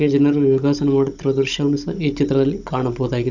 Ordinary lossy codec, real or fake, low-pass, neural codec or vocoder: none; fake; 7.2 kHz; codec, 16 kHz, 16 kbps, FunCodec, trained on Chinese and English, 50 frames a second